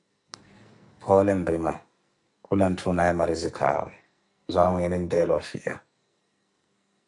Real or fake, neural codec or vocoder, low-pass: fake; codec, 44.1 kHz, 2.6 kbps, SNAC; 10.8 kHz